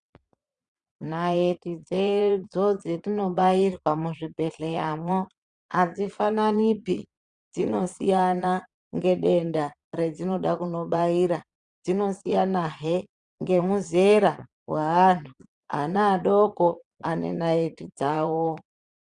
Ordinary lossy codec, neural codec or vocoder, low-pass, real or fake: Opus, 64 kbps; vocoder, 22.05 kHz, 80 mel bands, Vocos; 9.9 kHz; fake